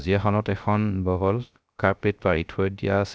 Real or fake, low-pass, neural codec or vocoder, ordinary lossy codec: fake; none; codec, 16 kHz, 0.3 kbps, FocalCodec; none